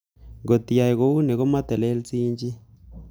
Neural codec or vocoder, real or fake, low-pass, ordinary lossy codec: none; real; none; none